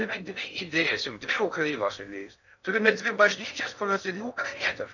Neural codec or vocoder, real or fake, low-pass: codec, 16 kHz in and 24 kHz out, 0.6 kbps, FocalCodec, streaming, 4096 codes; fake; 7.2 kHz